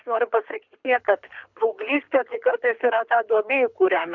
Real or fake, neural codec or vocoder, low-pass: fake; codec, 24 kHz, 6 kbps, HILCodec; 7.2 kHz